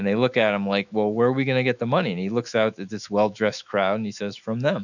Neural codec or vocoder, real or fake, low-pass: autoencoder, 48 kHz, 128 numbers a frame, DAC-VAE, trained on Japanese speech; fake; 7.2 kHz